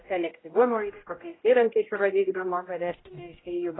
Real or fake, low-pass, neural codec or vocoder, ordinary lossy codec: fake; 7.2 kHz; codec, 16 kHz, 0.5 kbps, X-Codec, HuBERT features, trained on general audio; AAC, 16 kbps